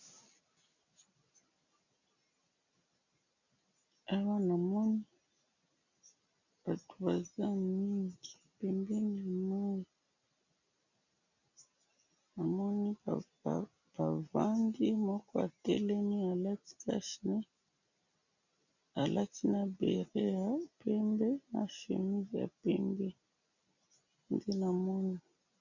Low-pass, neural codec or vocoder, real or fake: 7.2 kHz; none; real